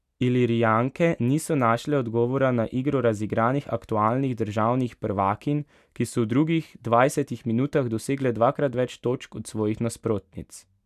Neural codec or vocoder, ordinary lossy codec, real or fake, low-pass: none; none; real; 14.4 kHz